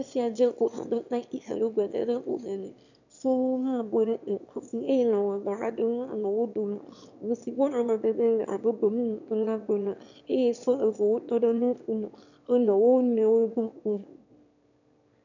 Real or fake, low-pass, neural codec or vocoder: fake; 7.2 kHz; autoencoder, 22.05 kHz, a latent of 192 numbers a frame, VITS, trained on one speaker